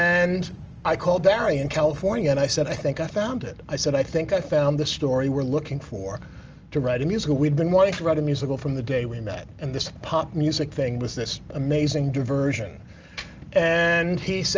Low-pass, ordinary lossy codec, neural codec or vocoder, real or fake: 7.2 kHz; Opus, 24 kbps; none; real